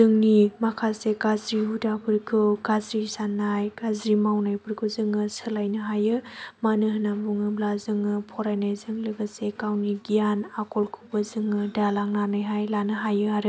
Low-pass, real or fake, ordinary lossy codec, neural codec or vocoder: none; real; none; none